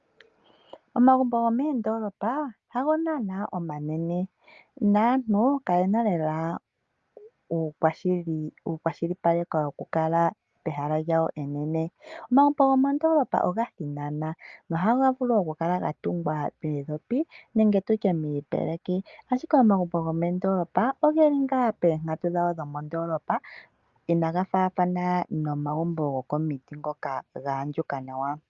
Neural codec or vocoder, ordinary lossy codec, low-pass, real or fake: none; Opus, 32 kbps; 7.2 kHz; real